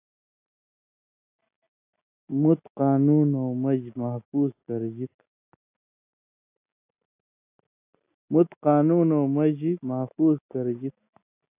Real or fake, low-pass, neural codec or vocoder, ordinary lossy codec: real; 3.6 kHz; none; AAC, 24 kbps